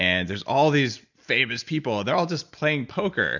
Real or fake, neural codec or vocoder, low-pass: real; none; 7.2 kHz